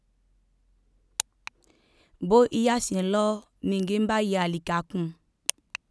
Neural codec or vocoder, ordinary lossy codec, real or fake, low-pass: none; none; real; none